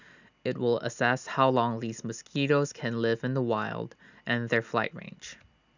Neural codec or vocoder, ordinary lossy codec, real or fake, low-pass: none; none; real; 7.2 kHz